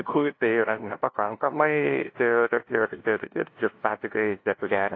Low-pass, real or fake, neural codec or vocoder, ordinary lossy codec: 7.2 kHz; fake; codec, 16 kHz, 0.5 kbps, FunCodec, trained on LibriTTS, 25 frames a second; AAC, 32 kbps